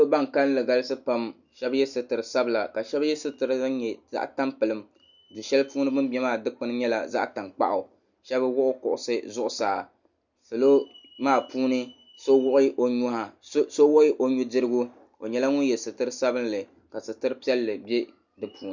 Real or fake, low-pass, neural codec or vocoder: real; 7.2 kHz; none